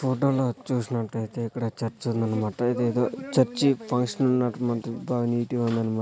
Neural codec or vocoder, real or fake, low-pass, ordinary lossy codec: none; real; none; none